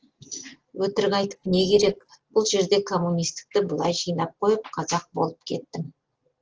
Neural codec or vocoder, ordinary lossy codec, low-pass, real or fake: none; Opus, 16 kbps; 7.2 kHz; real